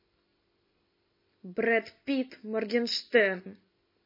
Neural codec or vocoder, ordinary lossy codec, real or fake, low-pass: none; MP3, 24 kbps; real; 5.4 kHz